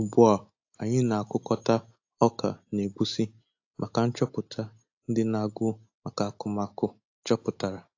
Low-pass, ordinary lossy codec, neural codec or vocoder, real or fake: 7.2 kHz; AAC, 48 kbps; none; real